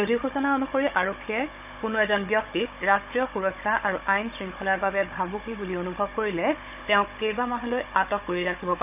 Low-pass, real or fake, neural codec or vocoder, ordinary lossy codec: 3.6 kHz; fake; codec, 16 kHz, 16 kbps, FunCodec, trained on Chinese and English, 50 frames a second; none